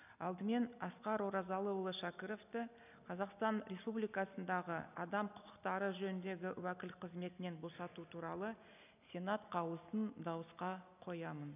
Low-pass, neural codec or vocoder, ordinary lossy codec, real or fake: 3.6 kHz; none; none; real